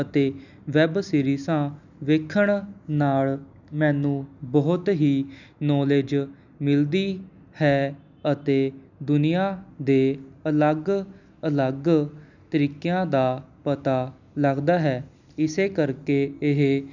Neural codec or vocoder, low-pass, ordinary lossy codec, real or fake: none; 7.2 kHz; none; real